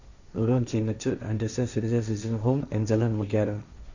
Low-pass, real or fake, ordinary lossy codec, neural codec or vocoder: 7.2 kHz; fake; none; codec, 16 kHz, 1.1 kbps, Voila-Tokenizer